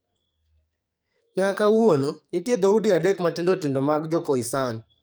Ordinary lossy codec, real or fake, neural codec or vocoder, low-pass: none; fake; codec, 44.1 kHz, 2.6 kbps, SNAC; none